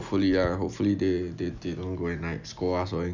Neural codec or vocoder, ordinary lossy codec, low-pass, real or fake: none; none; 7.2 kHz; real